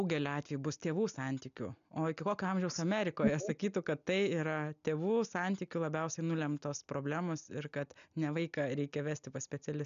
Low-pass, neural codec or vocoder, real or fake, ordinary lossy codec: 7.2 kHz; none; real; MP3, 96 kbps